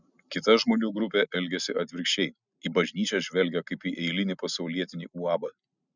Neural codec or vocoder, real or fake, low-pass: none; real; 7.2 kHz